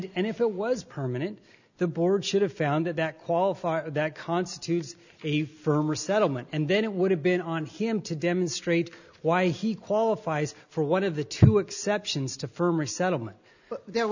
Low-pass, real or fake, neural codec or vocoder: 7.2 kHz; real; none